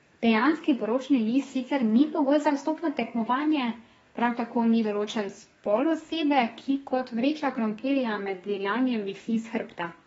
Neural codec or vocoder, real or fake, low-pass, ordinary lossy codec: codec, 32 kHz, 1.9 kbps, SNAC; fake; 14.4 kHz; AAC, 24 kbps